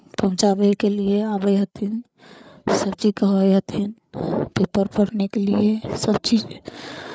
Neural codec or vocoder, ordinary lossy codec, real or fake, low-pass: codec, 16 kHz, 8 kbps, FreqCodec, larger model; none; fake; none